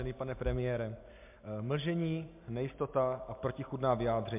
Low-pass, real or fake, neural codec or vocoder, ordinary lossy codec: 3.6 kHz; real; none; MP3, 32 kbps